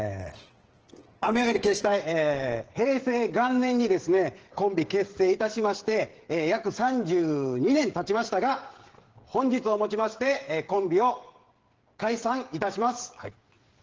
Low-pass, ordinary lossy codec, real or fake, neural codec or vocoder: 7.2 kHz; Opus, 16 kbps; fake; codec, 16 kHz, 8 kbps, FreqCodec, smaller model